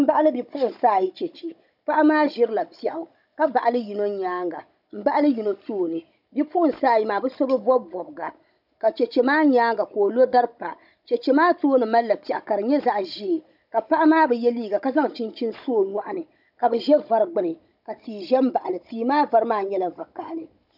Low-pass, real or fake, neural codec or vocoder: 5.4 kHz; fake; codec, 16 kHz, 16 kbps, FunCodec, trained on Chinese and English, 50 frames a second